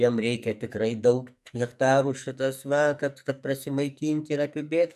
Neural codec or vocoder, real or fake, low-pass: codec, 44.1 kHz, 2.6 kbps, SNAC; fake; 14.4 kHz